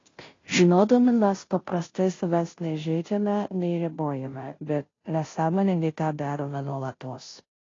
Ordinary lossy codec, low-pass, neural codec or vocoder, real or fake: AAC, 32 kbps; 7.2 kHz; codec, 16 kHz, 0.5 kbps, FunCodec, trained on Chinese and English, 25 frames a second; fake